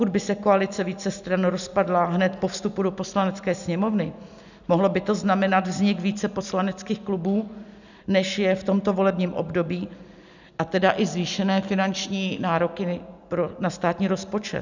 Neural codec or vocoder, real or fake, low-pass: none; real; 7.2 kHz